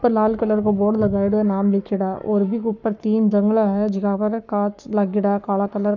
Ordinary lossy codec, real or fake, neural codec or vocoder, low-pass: none; fake; codec, 44.1 kHz, 7.8 kbps, Pupu-Codec; 7.2 kHz